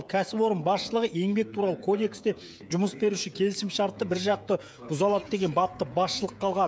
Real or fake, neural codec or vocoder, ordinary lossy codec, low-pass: fake; codec, 16 kHz, 16 kbps, FreqCodec, smaller model; none; none